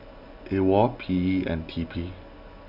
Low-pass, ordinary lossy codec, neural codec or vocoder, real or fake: 5.4 kHz; Opus, 64 kbps; none; real